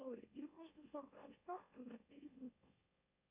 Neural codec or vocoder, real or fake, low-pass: autoencoder, 44.1 kHz, a latent of 192 numbers a frame, MeloTTS; fake; 3.6 kHz